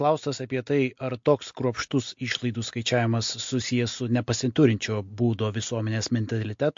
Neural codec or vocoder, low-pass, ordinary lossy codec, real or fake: none; 7.2 kHz; MP3, 48 kbps; real